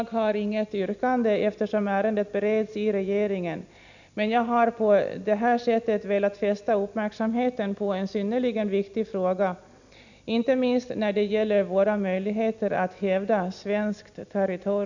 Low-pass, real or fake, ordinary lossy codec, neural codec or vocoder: 7.2 kHz; real; none; none